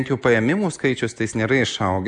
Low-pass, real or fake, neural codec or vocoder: 9.9 kHz; real; none